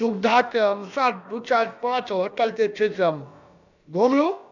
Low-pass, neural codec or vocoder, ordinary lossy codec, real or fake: 7.2 kHz; codec, 16 kHz, about 1 kbps, DyCAST, with the encoder's durations; none; fake